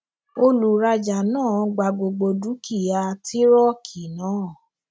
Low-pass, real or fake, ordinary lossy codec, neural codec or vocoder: none; real; none; none